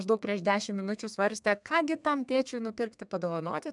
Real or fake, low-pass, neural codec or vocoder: fake; 10.8 kHz; codec, 32 kHz, 1.9 kbps, SNAC